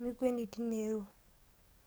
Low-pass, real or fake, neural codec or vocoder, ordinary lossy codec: none; fake; codec, 44.1 kHz, 7.8 kbps, DAC; none